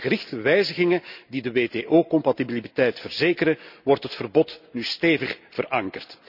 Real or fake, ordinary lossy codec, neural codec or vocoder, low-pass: real; none; none; 5.4 kHz